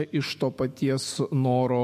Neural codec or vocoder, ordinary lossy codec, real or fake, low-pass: autoencoder, 48 kHz, 128 numbers a frame, DAC-VAE, trained on Japanese speech; MP3, 64 kbps; fake; 14.4 kHz